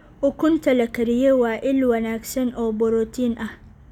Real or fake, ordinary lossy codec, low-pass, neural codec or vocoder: real; none; 19.8 kHz; none